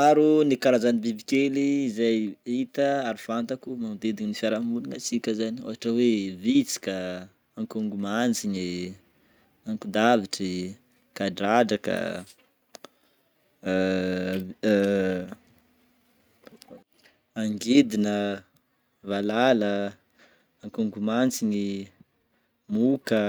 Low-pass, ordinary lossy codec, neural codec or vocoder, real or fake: none; none; none; real